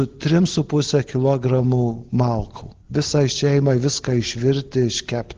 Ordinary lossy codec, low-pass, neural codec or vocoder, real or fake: Opus, 16 kbps; 7.2 kHz; none; real